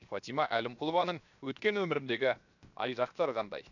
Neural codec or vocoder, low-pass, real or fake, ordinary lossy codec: codec, 16 kHz, 0.7 kbps, FocalCodec; 7.2 kHz; fake; none